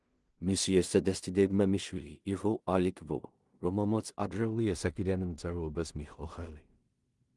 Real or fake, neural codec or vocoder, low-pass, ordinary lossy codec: fake; codec, 16 kHz in and 24 kHz out, 0.4 kbps, LongCat-Audio-Codec, two codebook decoder; 10.8 kHz; Opus, 24 kbps